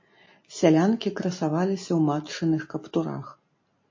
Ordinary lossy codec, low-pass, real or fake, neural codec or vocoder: MP3, 32 kbps; 7.2 kHz; real; none